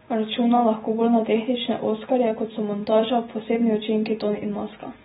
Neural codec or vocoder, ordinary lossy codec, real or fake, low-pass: none; AAC, 16 kbps; real; 19.8 kHz